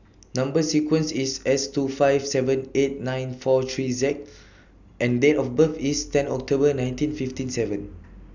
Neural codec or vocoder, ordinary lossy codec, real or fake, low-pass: none; none; real; 7.2 kHz